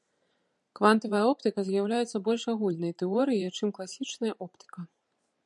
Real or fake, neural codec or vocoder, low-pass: fake; vocoder, 44.1 kHz, 128 mel bands every 512 samples, BigVGAN v2; 10.8 kHz